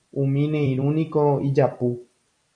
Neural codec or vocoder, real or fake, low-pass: vocoder, 44.1 kHz, 128 mel bands every 256 samples, BigVGAN v2; fake; 9.9 kHz